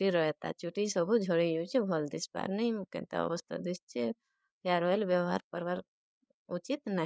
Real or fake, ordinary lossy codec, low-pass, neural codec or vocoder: fake; none; none; codec, 16 kHz, 8 kbps, FreqCodec, larger model